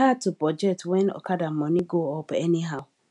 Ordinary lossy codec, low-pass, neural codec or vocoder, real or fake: none; 10.8 kHz; none; real